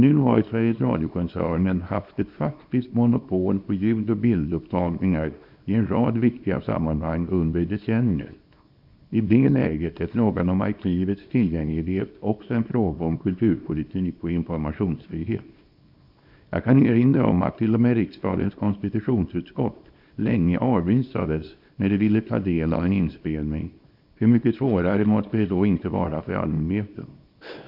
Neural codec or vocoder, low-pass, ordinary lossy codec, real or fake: codec, 24 kHz, 0.9 kbps, WavTokenizer, small release; 5.4 kHz; none; fake